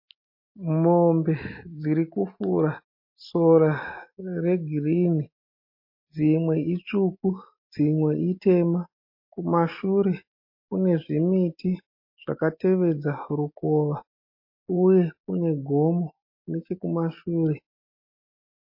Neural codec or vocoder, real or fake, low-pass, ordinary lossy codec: none; real; 5.4 kHz; MP3, 32 kbps